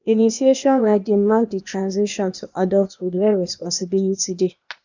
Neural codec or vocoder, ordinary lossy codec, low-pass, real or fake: codec, 16 kHz, 0.8 kbps, ZipCodec; none; 7.2 kHz; fake